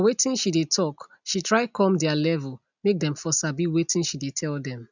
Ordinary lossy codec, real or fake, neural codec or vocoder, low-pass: none; real; none; 7.2 kHz